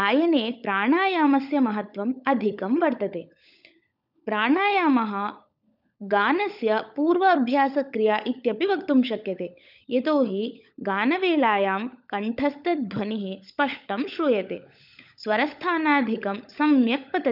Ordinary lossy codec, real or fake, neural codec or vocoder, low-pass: none; fake; codec, 16 kHz, 16 kbps, FunCodec, trained on LibriTTS, 50 frames a second; 5.4 kHz